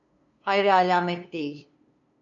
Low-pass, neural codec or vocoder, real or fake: 7.2 kHz; codec, 16 kHz, 2 kbps, FunCodec, trained on LibriTTS, 25 frames a second; fake